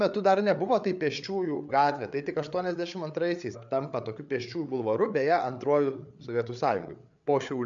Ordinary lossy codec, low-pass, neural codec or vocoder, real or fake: MP3, 64 kbps; 7.2 kHz; codec, 16 kHz, 8 kbps, FreqCodec, larger model; fake